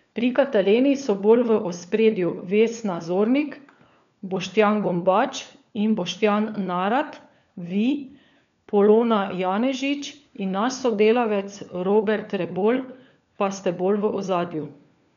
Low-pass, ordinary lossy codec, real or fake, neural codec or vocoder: 7.2 kHz; none; fake; codec, 16 kHz, 4 kbps, FunCodec, trained on LibriTTS, 50 frames a second